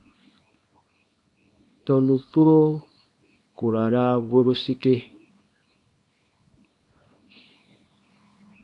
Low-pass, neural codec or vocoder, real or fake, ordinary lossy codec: 10.8 kHz; codec, 24 kHz, 0.9 kbps, WavTokenizer, small release; fake; AAC, 48 kbps